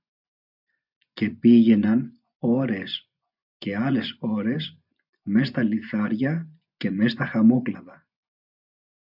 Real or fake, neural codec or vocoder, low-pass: fake; vocoder, 24 kHz, 100 mel bands, Vocos; 5.4 kHz